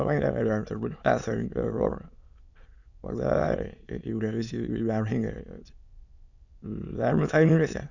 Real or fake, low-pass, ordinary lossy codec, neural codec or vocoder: fake; 7.2 kHz; Opus, 64 kbps; autoencoder, 22.05 kHz, a latent of 192 numbers a frame, VITS, trained on many speakers